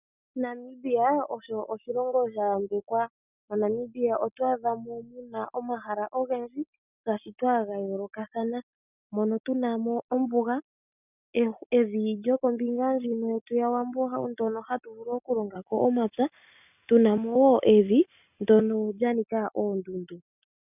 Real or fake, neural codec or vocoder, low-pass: real; none; 3.6 kHz